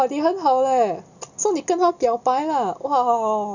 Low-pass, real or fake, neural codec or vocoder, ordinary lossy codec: 7.2 kHz; real; none; none